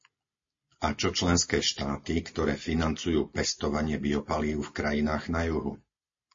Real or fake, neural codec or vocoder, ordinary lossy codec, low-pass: real; none; MP3, 32 kbps; 7.2 kHz